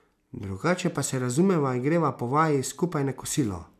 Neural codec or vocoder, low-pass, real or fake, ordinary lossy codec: none; 14.4 kHz; real; none